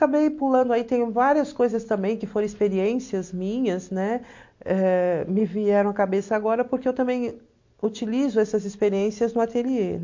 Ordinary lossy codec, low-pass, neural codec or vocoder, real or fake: MP3, 48 kbps; 7.2 kHz; none; real